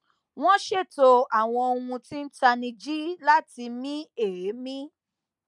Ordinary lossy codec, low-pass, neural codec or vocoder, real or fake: none; 10.8 kHz; none; real